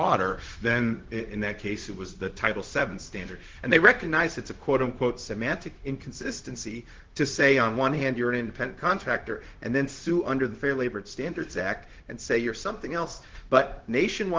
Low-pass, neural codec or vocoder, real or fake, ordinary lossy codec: 7.2 kHz; codec, 16 kHz, 0.4 kbps, LongCat-Audio-Codec; fake; Opus, 16 kbps